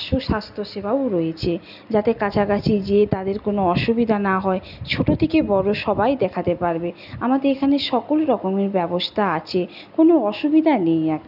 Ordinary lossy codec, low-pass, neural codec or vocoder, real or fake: none; 5.4 kHz; none; real